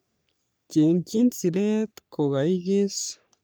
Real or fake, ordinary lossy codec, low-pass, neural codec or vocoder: fake; none; none; codec, 44.1 kHz, 3.4 kbps, Pupu-Codec